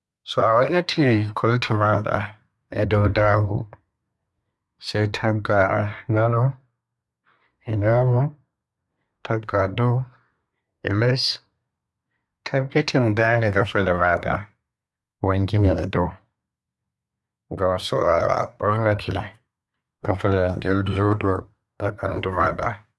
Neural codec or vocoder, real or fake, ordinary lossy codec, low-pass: codec, 24 kHz, 1 kbps, SNAC; fake; none; none